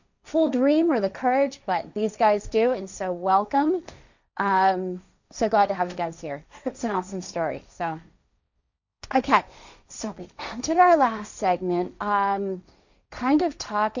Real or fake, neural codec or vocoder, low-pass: fake; codec, 16 kHz, 1.1 kbps, Voila-Tokenizer; 7.2 kHz